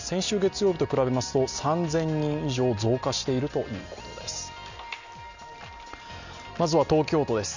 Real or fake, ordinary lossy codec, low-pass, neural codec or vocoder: real; none; 7.2 kHz; none